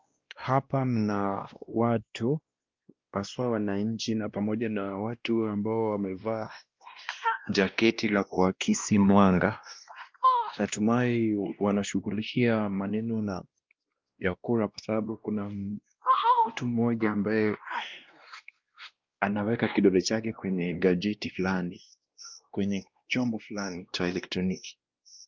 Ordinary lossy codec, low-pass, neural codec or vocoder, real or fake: Opus, 32 kbps; 7.2 kHz; codec, 16 kHz, 1 kbps, X-Codec, WavLM features, trained on Multilingual LibriSpeech; fake